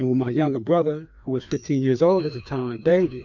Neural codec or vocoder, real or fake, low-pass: codec, 16 kHz, 2 kbps, FreqCodec, larger model; fake; 7.2 kHz